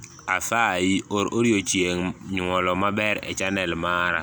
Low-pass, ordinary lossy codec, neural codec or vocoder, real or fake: none; none; none; real